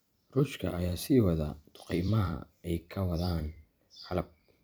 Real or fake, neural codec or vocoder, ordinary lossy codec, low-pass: fake; vocoder, 44.1 kHz, 128 mel bands every 256 samples, BigVGAN v2; none; none